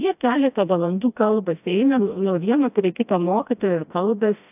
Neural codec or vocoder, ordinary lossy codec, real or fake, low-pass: codec, 16 kHz, 1 kbps, FreqCodec, smaller model; AAC, 32 kbps; fake; 3.6 kHz